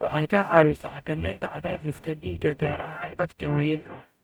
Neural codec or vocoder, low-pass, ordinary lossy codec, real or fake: codec, 44.1 kHz, 0.9 kbps, DAC; none; none; fake